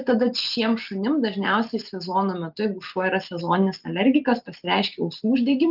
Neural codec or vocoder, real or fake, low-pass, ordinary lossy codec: none; real; 5.4 kHz; Opus, 32 kbps